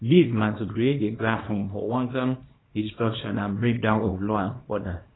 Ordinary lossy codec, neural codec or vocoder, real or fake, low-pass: AAC, 16 kbps; codec, 24 kHz, 0.9 kbps, WavTokenizer, small release; fake; 7.2 kHz